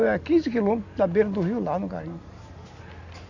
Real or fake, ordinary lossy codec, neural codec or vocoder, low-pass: real; none; none; 7.2 kHz